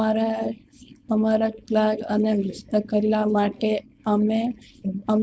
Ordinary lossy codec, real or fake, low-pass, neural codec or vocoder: none; fake; none; codec, 16 kHz, 4.8 kbps, FACodec